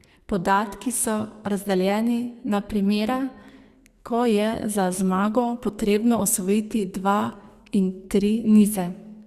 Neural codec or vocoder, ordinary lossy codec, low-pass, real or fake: codec, 44.1 kHz, 2.6 kbps, SNAC; Opus, 64 kbps; 14.4 kHz; fake